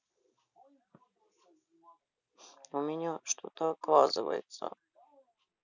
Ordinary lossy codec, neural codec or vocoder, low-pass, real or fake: none; none; 7.2 kHz; real